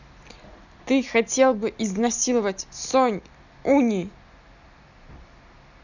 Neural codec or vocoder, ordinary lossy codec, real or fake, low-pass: none; none; real; 7.2 kHz